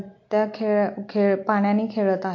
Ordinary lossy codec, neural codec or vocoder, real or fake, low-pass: MP3, 48 kbps; none; real; 7.2 kHz